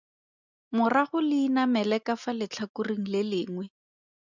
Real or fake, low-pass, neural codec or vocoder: real; 7.2 kHz; none